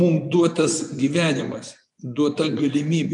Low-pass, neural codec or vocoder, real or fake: 10.8 kHz; vocoder, 24 kHz, 100 mel bands, Vocos; fake